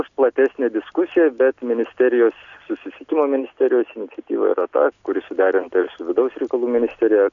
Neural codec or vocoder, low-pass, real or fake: none; 7.2 kHz; real